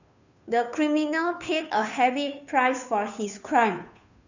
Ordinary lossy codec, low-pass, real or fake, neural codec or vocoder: none; 7.2 kHz; fake; codec, 16 kHz, 2 kbps, FunCodec, trained on Chinese and English, 25 frames a second